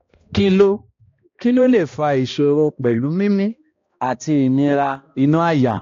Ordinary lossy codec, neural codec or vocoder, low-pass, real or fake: AAC, 48 kbps; codec, 16 kHz, 1 kbps, X-Codec, HuBERT features, trained on balanced general audio; 7.2 kHz; fake